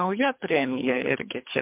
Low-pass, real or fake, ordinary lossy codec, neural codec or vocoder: 3.6 kHz; fake; MP3, 32 kbps; codec, 24 kHz, 3 kbps, HILCodec